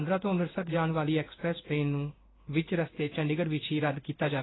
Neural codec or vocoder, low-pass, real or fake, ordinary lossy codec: codec, 16 kHz in and 24 kHz out, 1 kbps, XY-Tokenizer; 7.2 kHz; fake; AAC, 16 kbps